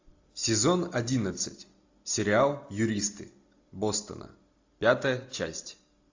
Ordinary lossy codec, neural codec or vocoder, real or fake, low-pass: AAC, 48 kbps; none; real; 7.2 kHz